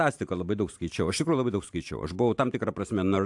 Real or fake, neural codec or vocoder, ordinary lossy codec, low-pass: fake; vocoder, 44.1 kHz, 128 mel bands every 512 samples, BigVGAN v2; AAC, 64 kbps; 10.8 kHz